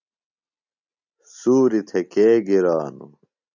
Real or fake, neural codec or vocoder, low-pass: real; none; 7.2 kHz